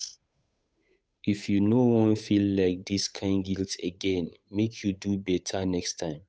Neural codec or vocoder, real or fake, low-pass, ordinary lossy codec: codec, 16 kHz, 8 kbps, FunCodec, trained on Chinese and English, 25 frames a second; fake; none; none